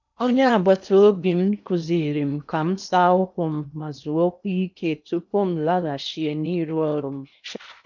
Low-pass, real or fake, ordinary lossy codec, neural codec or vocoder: 7.2 kHz; fake; none; codec, 16 kHz in and 24 kHz out, 0.8 kbps, FocalCodec, streaming, 65536 codes